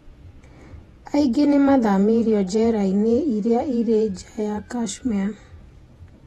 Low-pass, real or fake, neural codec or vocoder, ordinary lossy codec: 19.8 kHz; fake; vocoder, 48 kHz, 128 mel bands, Vocos; AAC, 32 kbps